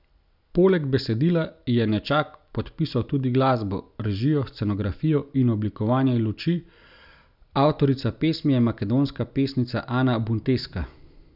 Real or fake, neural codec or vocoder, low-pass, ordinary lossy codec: real; none; 5.4 kHz; none